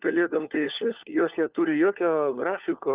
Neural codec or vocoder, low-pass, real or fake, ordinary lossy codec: codec, 16 kHz, 4 kbps, FunCodec, trained on LibriTTS, 50 frames a second; 3.6 kHz; fake; Opus, 24 kbps